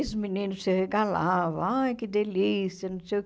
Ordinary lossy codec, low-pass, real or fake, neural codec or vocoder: none; none; real; none